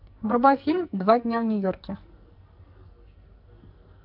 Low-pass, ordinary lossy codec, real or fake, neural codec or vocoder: 5.4 kHz; AAC, 48 kbps; fake; codec, 44.1 kHz, 2.6 kbps, SNAC